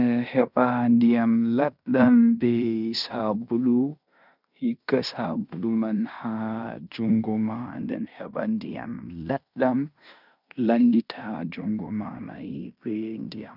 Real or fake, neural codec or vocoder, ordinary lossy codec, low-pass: fake; codec, 16 kHz in and 24 kHz out, 0.9 kbps, LongCat-Audio-Codec, fine tuned four codebook decoder; none; 5.4 kHz